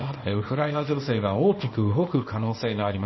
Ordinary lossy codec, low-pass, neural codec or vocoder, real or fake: MP3, 24 kbps; 7.2 kHz; codec, 24 kHz, 0.9 kbps, WavTokenizer, small release; fake